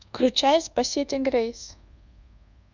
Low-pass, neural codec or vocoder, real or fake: 7.2 kHz; codec, 24 kHz, 1.2 kbps, DualCodec; fake